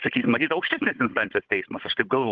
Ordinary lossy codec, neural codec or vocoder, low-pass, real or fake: Opus, 32 kbps; codec, 16 kHz, 16 kbps, FunCodec, trained on Chinese and English, 50 frames a second; 7.2 kHz; fake